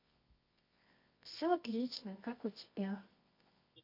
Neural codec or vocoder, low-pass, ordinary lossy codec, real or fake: codec, 24 kHz, 0.9 kbps, WavTokenizer, medium music audio release; 5.4 kHz; MP3, 32 kbps; fake